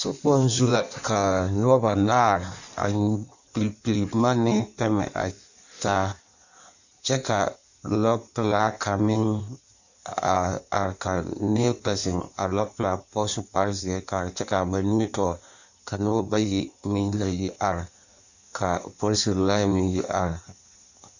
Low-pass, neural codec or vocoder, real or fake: 7.2 kHz; codec, 16 kHz in and 24 kHz out, 1.1 kbps, FireRedTTS-2 codec; fake